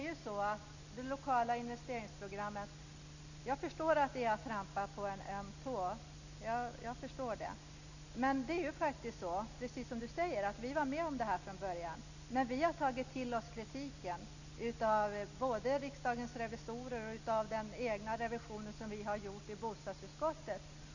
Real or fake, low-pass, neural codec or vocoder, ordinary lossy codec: real; 7.2 kHz; none; none